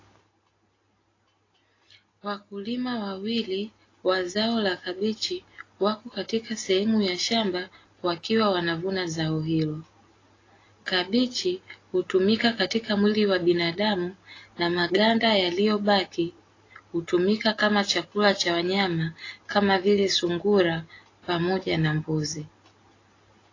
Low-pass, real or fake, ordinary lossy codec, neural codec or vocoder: 7.2 kHz; real; AAC, 32 kbps; none